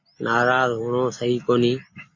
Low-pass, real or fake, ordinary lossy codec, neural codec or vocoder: 7.2 kHz; real; MP3, 32 kbps; none